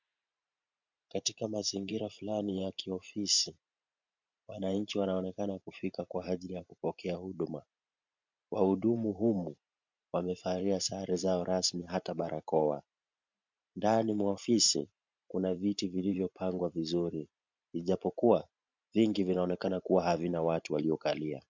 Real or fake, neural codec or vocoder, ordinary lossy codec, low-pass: fake; vocoder, 44.1 kHz, 128 mel bands every 512 samples, BigVGAN v2; MP3, 64 kbps; 7.2 kHz